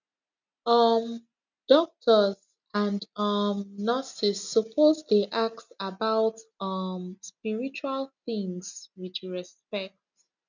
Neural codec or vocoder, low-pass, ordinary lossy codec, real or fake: none; 7.2 kHz; none; real